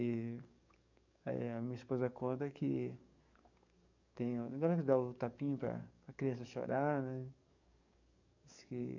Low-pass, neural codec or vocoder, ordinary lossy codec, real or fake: 7.2 kHz; codec, 44.1 kHz, 7.8 kbps, DAC; none; fake